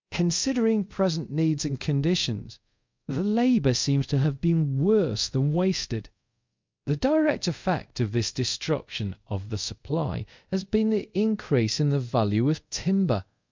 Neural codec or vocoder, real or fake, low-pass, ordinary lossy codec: codec, 24 kHz, 0.5 kbps, DualCodec; fake; 7.2 kHz; MP3, 64 kbps